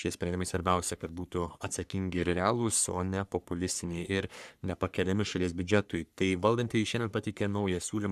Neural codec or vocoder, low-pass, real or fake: codec, 44.1 kHz, 3.4 kbps, Pupu-Codec; 14.4 kHz; fake